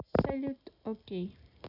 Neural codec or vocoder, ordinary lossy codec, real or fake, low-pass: none; none; real; 5.4 kHz